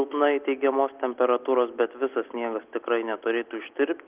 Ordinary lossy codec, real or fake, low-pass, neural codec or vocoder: Opus, 32 kbps; real; 3.6 kHz; none